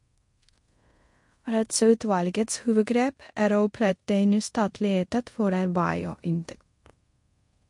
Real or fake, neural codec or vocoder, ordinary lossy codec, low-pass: fake; codec, 24 kHz, 0.5 kbps, DualCodec; MP3, 48 kbps; 10.8 kHz